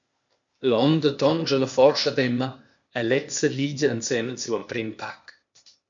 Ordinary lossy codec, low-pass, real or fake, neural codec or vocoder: MP3, 64 kbps; 7.2 kHz; fake; codec, 16 kHz, 0.8 kbps, ZipCodec